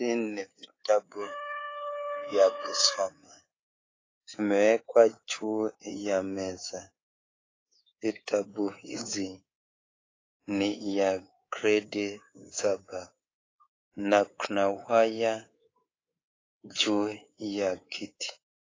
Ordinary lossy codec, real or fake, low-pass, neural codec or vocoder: AAC, 32 kbps; fake; 7.2 kHz; codec, 24 kHz, 3.1 kbps, DualCodec